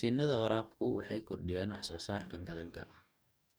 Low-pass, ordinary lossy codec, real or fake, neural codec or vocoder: none; none; fake; codec, 44.1 kHz, 2.6 kbps, DAC